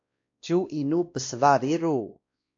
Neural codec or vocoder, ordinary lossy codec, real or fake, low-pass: codec, 16 kHz, 1 kbps, X-Codec, WavLM features, trained on Multilingual LibriSpeech; AAC, 48 kbps; fake; 7.2 kHz